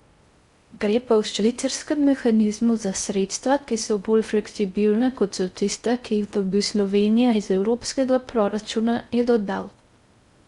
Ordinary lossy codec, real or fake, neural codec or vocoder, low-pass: Opus, 64 kbps; fake; codec, 16 kHz in and 24 kHz out, 0.6 kbps, FocalCodec, streaming, 4096 codes; 10.8 kHz